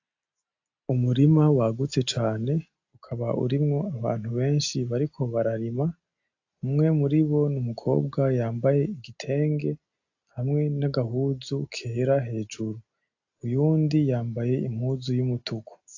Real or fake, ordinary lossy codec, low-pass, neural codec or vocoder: real; AAC, 48 kbps; 7.2 kHz; none